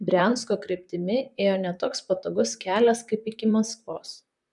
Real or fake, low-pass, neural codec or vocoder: fake; 10.8 kHz; vocoder, 44.1 kHz, 128 mel bands, Pupu-Vocoder